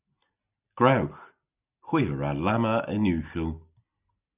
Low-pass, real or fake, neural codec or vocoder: 3.6 kHz; real; none